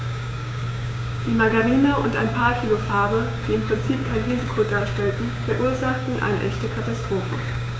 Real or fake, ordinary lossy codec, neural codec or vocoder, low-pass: fake; none; codec, 16 kHz, 6 kbps, DAC; none